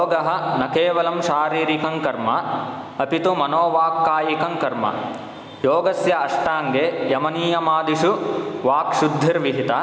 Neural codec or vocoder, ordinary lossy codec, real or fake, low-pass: none; none; real; none